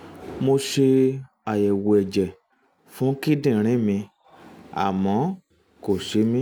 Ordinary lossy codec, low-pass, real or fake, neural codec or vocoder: none; none; real; none